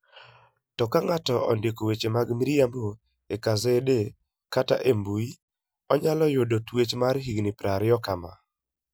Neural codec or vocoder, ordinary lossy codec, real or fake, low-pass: none; none; real; none